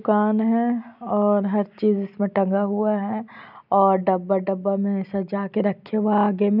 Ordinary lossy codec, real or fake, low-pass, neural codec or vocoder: none; real; 5.4 kHz; none